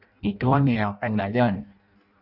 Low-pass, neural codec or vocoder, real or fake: 5.4 kHz; codec, 16 kHz in and 24 kHz out, 0.6 kbps, FireRedTTS-2 codec; fake